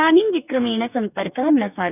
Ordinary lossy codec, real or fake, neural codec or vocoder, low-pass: none; fake; codec, 44.1 kHz, 2.6 kbps, DAC; 3.6 kHz